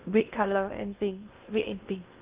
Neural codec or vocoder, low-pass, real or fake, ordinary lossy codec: codec, 16 kHz in and 24 kHz out, 0.6 kbps, FocalCodec, streaming, 2048 codes; 3.6 kHz; fake; Opus, 32 kbps